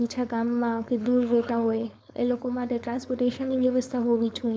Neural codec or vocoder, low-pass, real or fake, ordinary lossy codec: codec, 16 kHz, 4.8 kbps, FACodec; none; fake; none